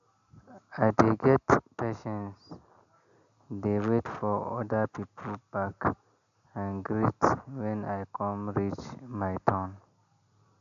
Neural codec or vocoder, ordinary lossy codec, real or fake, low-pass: none; AAC, 96 kbps; real; 7.2 kHz